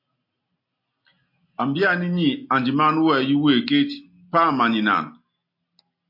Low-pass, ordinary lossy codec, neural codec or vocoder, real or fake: 5.4 kHz; MP3, 32 kbps; none; real